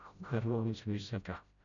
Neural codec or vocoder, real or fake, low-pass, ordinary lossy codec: codec, 16 kHz, 0.5 kbps, FreqCodec, smaller model; fake; 7.2 kHz; none